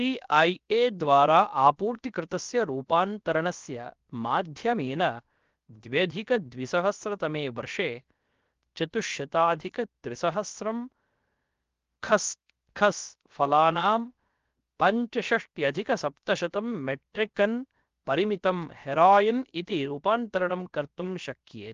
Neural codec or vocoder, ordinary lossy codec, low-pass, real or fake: codec, 16 kHz, 0.7 kbps, FocalCodec; Opus, 32 kbps; 7.2 kHz; fake